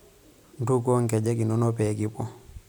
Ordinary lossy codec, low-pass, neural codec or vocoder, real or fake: none; none; none; real